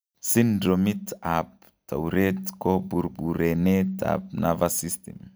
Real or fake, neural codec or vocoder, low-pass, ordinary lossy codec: real; none; none; none